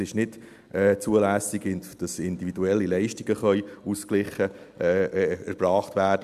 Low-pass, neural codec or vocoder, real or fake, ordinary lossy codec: 14.4 kHz; none; real; MP3, 96 kbps